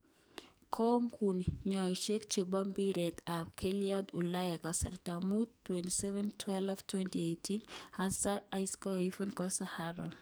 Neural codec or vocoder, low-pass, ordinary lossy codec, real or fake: codec, 44.1 kHz, 2.6 kbps, SNAC; none; none; fake